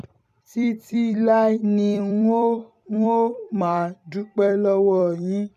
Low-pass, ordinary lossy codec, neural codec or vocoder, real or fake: 14.4 kHz; none; vocoder, 44.1 kHz, 128 mel bands every 256 samples, BigVGAN v2; fake